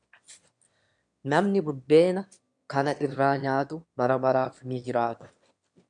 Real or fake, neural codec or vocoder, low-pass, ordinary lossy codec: fake; autoencoder, 22.05 kHz, a latent of 192 numbers a frame, VITS, trained on one speaker; 9.9 kHz; MP3, 64 kbps